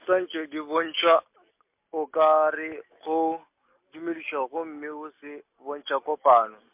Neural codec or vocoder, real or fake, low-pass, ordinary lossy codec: none; real; 3.6 kHz; MP3, 32 kbps